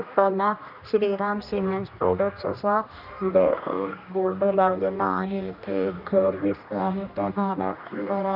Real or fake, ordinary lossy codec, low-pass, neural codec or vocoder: fake; none; 5.4 kHz; codec, 16 kHz, 1 kbps, X-Codec, HuBERT features, trained on general audio